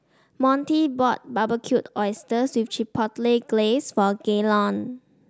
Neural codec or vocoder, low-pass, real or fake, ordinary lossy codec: none; none; real; none